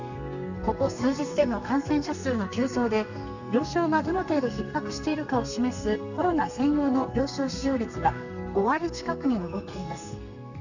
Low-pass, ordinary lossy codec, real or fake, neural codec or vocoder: 7.2 kHz; none; fake; codec, 32 kHz, 1.9 kbps, SNAC